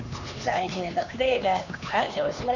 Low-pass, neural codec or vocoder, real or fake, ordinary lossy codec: 7.2 kHz; codec, 16 kHz, 2 kbps, X-Codec, HuBERT features, trained on LibriSpeech; fake; none